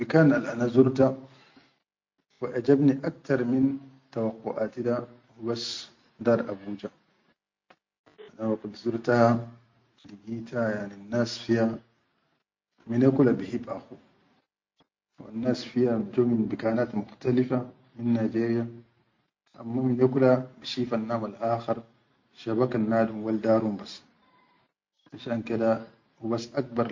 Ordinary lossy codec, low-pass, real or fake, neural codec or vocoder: MP3, 48 kbps; 7.2 kHz; real; none